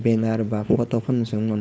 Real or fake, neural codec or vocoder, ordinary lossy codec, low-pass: fake; codec, 16 kHz, 4.8 kbps, FACodec; none; none